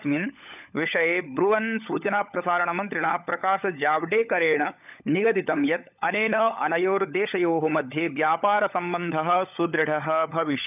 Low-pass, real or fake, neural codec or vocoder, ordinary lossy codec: 3.6 kHz; fake; codec, 16 kHz, 16 kbps, FunCodec, trained on LibriTTS, 50 frames a second; none